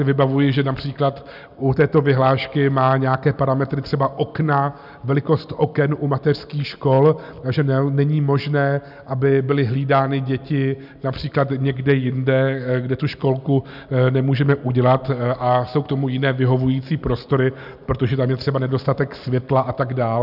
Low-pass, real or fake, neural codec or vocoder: 5.4 kHz; real; none